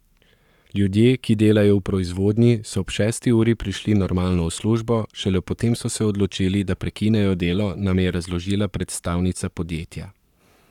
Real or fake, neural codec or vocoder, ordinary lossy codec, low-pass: fake; codec, 44.1 kHz, 7.8 kbps, Pupu-Codec; none; 19.8 kHz